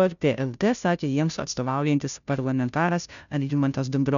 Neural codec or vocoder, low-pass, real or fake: codec, 16 kHz, 0.5 kbps, FunCodec, trained on Chinese and English, 25 frames a second; 7.2 kHz; fake